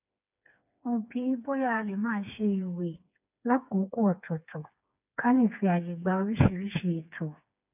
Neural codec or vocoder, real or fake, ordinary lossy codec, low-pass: codec, 16 kHz, 4 kbps, FreqCodec, smaller model; fake; none; 3.6 kHz